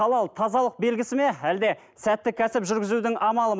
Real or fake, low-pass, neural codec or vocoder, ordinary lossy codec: real; none; none; none